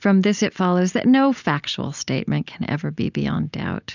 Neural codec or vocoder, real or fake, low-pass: none; real; 7.2 kHz